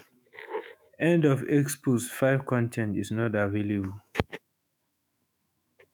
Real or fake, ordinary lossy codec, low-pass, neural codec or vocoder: fake; none; none; autoencoder, 48 kHz, 128 numbers a frame, DAC-VAE, trained on Japanese speech